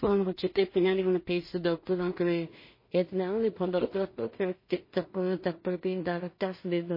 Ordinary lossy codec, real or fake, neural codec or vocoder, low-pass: MP3, 24 kbps; fake; codec, 16 kHz in and 24 kHz out, 0.4 kbps, LongCat-Audio-Codec, two codebook decoder; 5.4 kHz